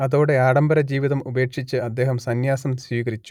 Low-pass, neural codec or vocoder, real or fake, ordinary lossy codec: 19.8 kHz; none; real; none